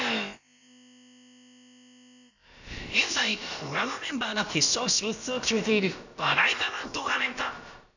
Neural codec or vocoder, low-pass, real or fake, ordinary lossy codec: codec, 16 kHz, about 1 kbps, DyCAST, with the encoder's durations; 7.2 kHz; fake; none